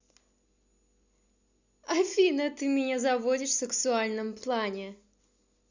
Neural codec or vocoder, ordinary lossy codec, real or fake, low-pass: none; Opus, 64 kbps; real; 7.2 kHz